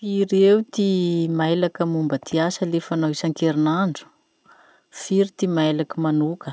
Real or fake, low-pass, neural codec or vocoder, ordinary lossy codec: real; none; none; none